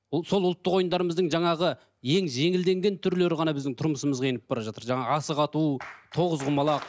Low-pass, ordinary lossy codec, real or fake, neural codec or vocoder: none; none; real; none